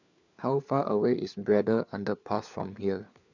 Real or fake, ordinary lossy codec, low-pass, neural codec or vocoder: fake; none; 7.2 kHz; codec, 16 kHz, 4 kbps, FunCodec, trained on LibriTTS, 50 frames a second